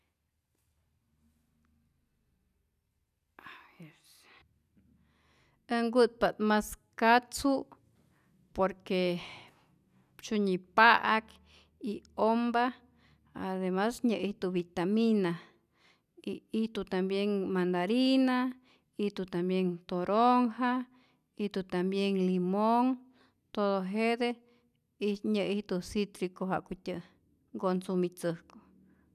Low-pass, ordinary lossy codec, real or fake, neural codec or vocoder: 14.4 kHz; none; real; none